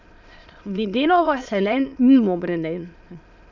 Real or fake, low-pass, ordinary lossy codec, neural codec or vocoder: fake; 7.2 kHz; none; autoencoder, 22.05 kHz, a latent of 192 numbers a frame, VITS, trained on many speakers